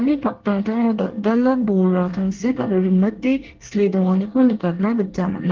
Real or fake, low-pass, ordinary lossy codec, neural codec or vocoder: fake; 7.2 kHz; Opus, 16 kbps; codec, 24 kHz, 1 kbps, SNAC